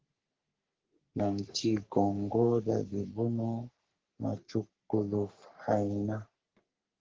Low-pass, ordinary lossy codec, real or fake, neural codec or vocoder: 7.2 kHz; Opus, 16 kbps; fake; codec, 44.1 kHz, 3.4 kbps, Pupu-Codec